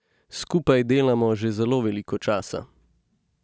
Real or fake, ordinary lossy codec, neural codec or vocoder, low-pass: real; none; none; none